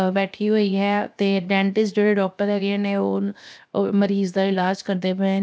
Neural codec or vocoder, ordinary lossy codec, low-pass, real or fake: codec, 16 kHz, 0.7 kbps, FocalCodec; none; none; fake